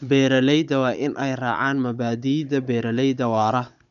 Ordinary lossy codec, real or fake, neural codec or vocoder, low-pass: none; fake; codec, 16 kHz, 16 kbps, FunCodec, trained on Chinese and English, 50 frames a second; 7.2 kHz